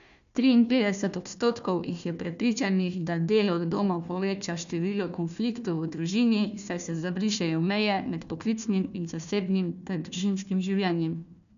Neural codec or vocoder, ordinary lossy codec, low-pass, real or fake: codec, 16 kHz, 1 kbps, FunCodec, trained on Chinese and English, 50 frames a second; none; 7.2 kHz; fake